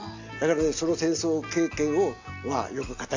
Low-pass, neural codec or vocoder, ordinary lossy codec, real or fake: 7.2 kHz; none; AAC, 48 kbps; real